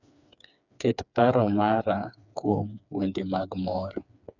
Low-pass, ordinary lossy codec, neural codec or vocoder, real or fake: 7.2 kHz; none; codec, 16 kHz, 4 kbps, FreqCodec, smaller model; fake